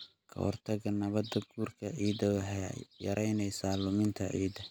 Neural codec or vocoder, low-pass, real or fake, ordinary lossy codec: none; none; real; none